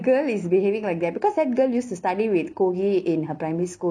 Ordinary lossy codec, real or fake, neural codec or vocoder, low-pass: none; real; none; 9.9 kHz